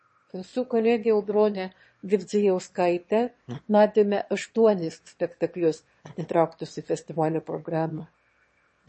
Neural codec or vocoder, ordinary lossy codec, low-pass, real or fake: autoencoder, 22.05 kHz, a latent of 192 numbers a frame, VITS, trained on one speaker; MP3, 32 kbps; 9.9 kHz; fake